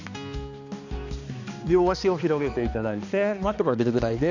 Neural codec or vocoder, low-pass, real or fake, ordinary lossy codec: codec, 16 kHz, 2 kbps, X-Codec, HuBERT features, trained on balanced general audio; 7.2 kHz; fake; none